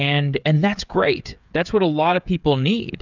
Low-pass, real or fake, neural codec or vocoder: 7.2 kHz; fake; codec, 16 kHz, 8 kbps, FreqCodec, smaller model